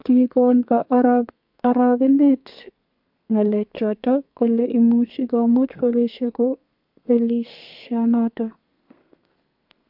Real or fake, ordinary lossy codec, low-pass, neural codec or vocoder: fake; MP3, 48 kbps; 5.4 kHz; codec, 32 kHz, 1.9 kbps, SNAC